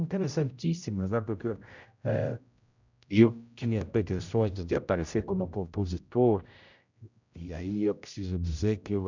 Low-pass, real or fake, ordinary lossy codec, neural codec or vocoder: 7.2 kHz; fake; none; codec, 16 kHz, 0.5 kbps, X-Codec, HuBERT features, trained on general audio